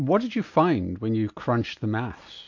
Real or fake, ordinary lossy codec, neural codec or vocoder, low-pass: real; MP3, 48 kbps; none; 7.2 kHz